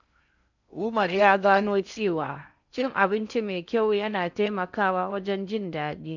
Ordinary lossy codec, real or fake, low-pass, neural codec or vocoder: none; fake; 7.2 kHz; codec, 16 kHz in and 24 kHz out, 0.6 kbps, FocalCodec, streaming, 4096 codes